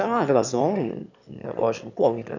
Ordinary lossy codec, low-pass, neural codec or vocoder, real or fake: none; 7.2 kHz; autoencoder, 22.05 kHz, a latent of 192 numbers a frame, VITS, trained on one speaker; fake